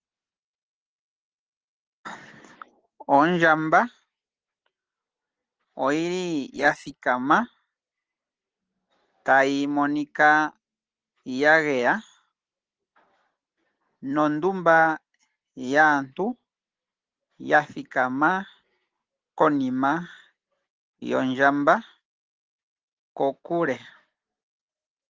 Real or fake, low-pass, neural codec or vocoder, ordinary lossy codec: real; 7.2 kHz; none; Opus, 16 kbps